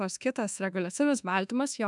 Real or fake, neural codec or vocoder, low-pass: fake; codec, 24 kHz, 1.2 kbps, DualCodec; 10.8 kHz